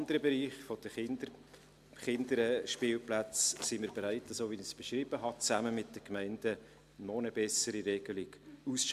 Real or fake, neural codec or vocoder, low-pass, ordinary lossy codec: real; none; 14.4 kHz; AAC, 96 kbps